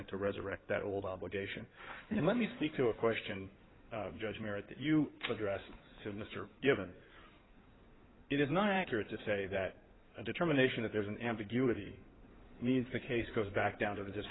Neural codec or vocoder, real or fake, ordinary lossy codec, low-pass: codec, 16 kHz in and 24 kHz out, 2.2 kbps, FireRedTTS-2 codec; fake; AAC, 16 kbps; 7.2 kHz